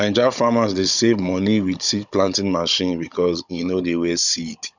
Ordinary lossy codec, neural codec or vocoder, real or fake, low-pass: none; codec, 16 kHz, 16 kbps, FunCodec, trained on Chinese and English, 50 frames a second; fake; 7.2 kHz